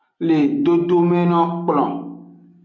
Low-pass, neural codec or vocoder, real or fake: 7.2 kHz; none; real